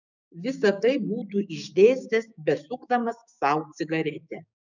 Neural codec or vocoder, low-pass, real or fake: codec, 16 kHz, 6 kbps, DAC; 7.2 kHz; fake